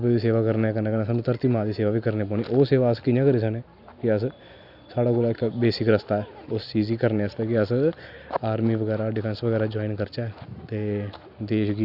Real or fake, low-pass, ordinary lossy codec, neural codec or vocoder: real; 5.4 kHz; none; none